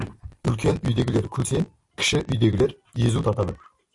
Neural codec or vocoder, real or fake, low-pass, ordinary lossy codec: vocoder, 44.1 kHz, 128 mel bands every 256 samples, BigVGAN v2; fake; 10.8 kHz; AAC, 64 kbps